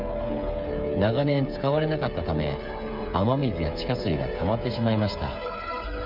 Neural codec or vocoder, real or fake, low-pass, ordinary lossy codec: codec, 16 kHz, 8 kbps, FreqCodec, smaller model; fake; 5.4 kHz; none